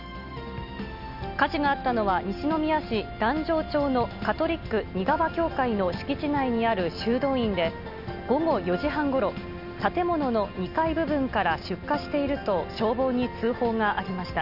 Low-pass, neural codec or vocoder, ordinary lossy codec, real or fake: 5.4 kHz; none; none; real